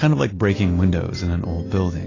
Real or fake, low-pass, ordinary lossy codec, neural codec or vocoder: real; 7.2 kHz; AAC, 32 kbps; none